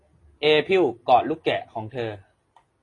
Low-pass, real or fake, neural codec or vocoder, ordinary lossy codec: 10.8 kHz; real; none; AAC, 32 kbps